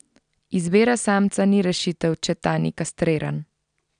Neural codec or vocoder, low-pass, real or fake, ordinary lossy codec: none; 9.9 kHz; real; none